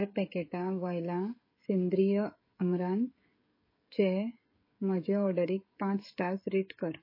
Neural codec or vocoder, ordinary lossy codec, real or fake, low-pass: codec, 16 kHz, 16 kbps, FreqCodec, smaller model; MP3, 24 kbps; fake; 5.4 kHz